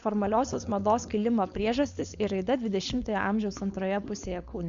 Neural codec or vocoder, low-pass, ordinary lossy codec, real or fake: codec, 16 kHz, 4.8 kbps, FACodec; 7.2 kHz; Opus, 64 kbps; fake